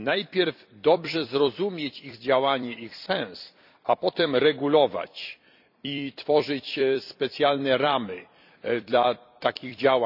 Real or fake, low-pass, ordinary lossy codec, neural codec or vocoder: fake; 5.4 kHz; none; vocoder, 44.1 kHz, 128 mel bands every 256 samples, BigVGAN v2